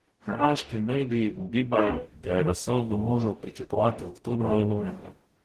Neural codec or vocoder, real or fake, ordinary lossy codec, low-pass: codec, 44.1 kHz, 0.9 kbps, DAC; fake; Opus, 16 kbps; 14.4 kHz